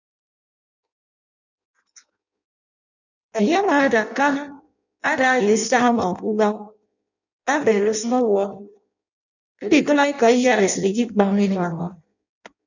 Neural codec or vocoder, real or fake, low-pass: codec, 16 kHz in and 24 kHz out, 0.6 kbps, FireRedTTS-2 codec; fake; 7.2 kHz